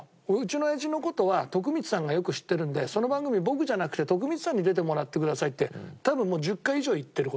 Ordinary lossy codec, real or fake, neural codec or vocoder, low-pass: none; real; none; none